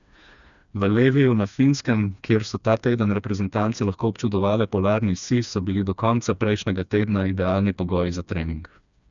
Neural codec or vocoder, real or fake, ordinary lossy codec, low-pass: codec, 16 kHz, 2 kbps, FreqCodec, smaller model; fake; none; 7.2 kHz